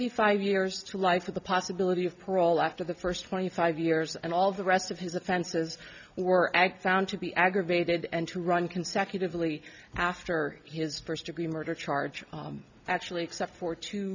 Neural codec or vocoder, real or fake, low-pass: none; real; 7.2 kHz